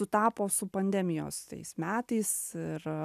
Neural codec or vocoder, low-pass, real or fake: none; 14.4 kHz; real